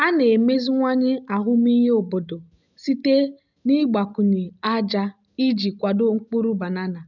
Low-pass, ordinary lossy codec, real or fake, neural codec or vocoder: 7.2 kHz; none; real; none